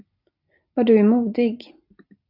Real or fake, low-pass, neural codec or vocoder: real; 5.4 kHz; none